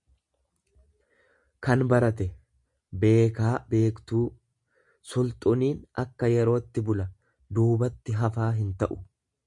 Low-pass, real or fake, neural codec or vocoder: 10.8 kHz; real; none